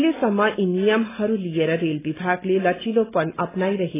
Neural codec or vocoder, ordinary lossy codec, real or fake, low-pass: none; AAC, 16 kbps; real; 3.6 kHz